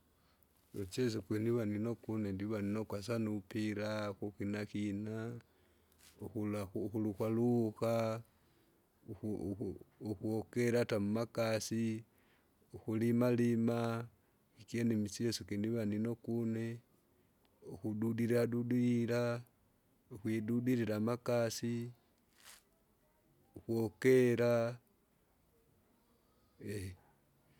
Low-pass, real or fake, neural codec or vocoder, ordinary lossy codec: 19.8 kHz; real; none; none